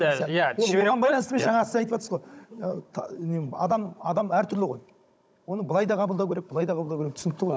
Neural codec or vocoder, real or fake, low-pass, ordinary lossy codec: codec, 16 kHz, 16 kbps, FunCodec, trained on Chinese and English, 50 frames a second; fake; none; none